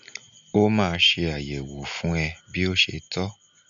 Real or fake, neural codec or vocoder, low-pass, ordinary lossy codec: real; none; 7.2 kHz; none